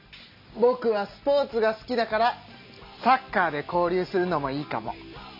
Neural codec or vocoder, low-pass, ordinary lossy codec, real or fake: none; 5.4 kHz; MP3, 48 kbps; real